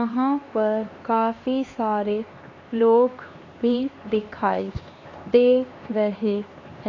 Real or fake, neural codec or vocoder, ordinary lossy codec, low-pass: fake; codec, 16 kHz, 2 kbps, X-Codec, HuBERT features, trained on LibriSpeech; AAC, 32 kbps; 7.2 kHz